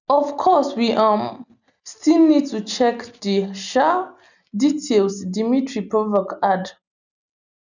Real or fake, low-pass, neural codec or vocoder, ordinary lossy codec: real; 7.2 kHz; none; none